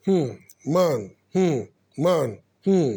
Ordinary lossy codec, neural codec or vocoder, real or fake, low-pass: none; none; real; none